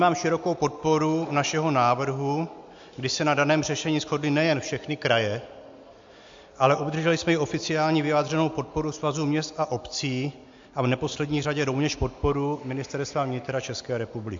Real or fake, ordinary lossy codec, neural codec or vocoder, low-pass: real; MP3, 48 kbps; none; 7.2 kHz